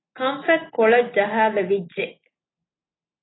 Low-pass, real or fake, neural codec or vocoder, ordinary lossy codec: 7.2 kHz; real; none; AAC, 16 kbps